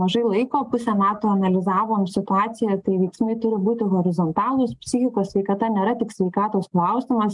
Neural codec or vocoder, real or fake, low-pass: none; real; 10.8 kHz